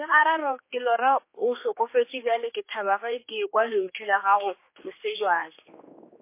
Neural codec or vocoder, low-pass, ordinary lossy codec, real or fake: codec, 16 kHz, 2 kbps, X-Codec, HuBERT features, trained on general audio; 3.6 kHz; MP3, 24 kbps; fake